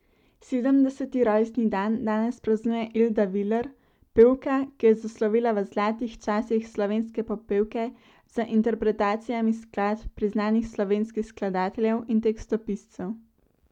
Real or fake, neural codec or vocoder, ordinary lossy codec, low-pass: real; none; none; 19.8 kHz